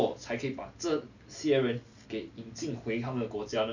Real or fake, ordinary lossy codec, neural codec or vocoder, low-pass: real; none; none; 7.2 kHz